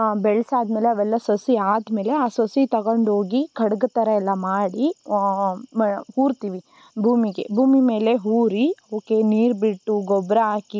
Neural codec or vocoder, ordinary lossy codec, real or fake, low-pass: none; none; real; none